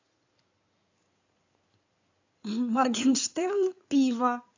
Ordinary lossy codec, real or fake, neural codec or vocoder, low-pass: AAC, 48 kbps; fake; codec, 16 kHz in and 24 kHz out, 2.2 kbps, FireRedTTS-2 codec; 7.2 kHz